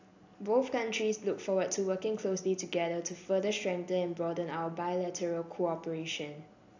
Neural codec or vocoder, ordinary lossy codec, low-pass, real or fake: none; AAC, 48 kbps; 7.2 kHz; real